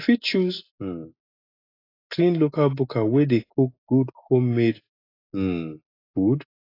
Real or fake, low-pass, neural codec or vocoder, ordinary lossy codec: real; 5.4 kHz; none; AAC, 24 kbps